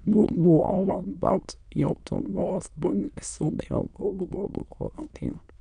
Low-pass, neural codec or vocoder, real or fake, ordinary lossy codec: 9.9 kHz; autoencoder, 22.05 kHz, a latent of 192 numbers a frame, VITS, trained on many speakers; fake; none